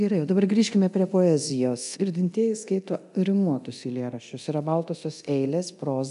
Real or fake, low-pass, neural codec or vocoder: fake; 10.8 kHz; codec, 24 kHz, 0.9 kbps, DualCodec